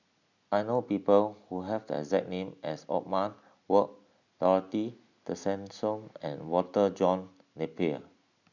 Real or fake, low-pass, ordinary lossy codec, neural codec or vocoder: real; 7.2 kHz; none; none